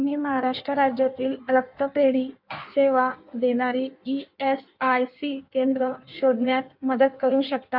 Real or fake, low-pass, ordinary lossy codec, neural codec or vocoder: fake; 5.4 kHz; MP3, 48 kbps; codec, 16 kHz in and 24 kHz out, 1.1 kbps, FireRedTTS-2 codec